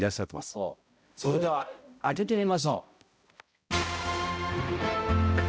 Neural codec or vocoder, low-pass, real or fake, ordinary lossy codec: codec, 16 kHz, 0.5 kbps, X-Codec, HuBERT features, trained on balanced general audio; none; fake; none